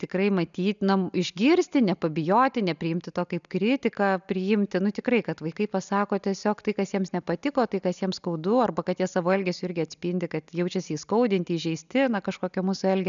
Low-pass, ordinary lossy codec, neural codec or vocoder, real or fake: 7.2 kHz; MP3, 96 kbps; none; real